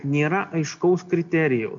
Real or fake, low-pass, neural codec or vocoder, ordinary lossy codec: real; 7.2 kHz; none; MP3, 64 kbps